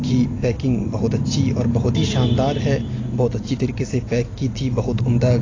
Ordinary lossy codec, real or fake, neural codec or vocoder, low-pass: AAC, 32 kbps; real; none; 7.2 kHz